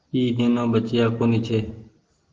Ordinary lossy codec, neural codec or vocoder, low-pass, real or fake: Opus, 16 kbps; none; 7.2 kHz; real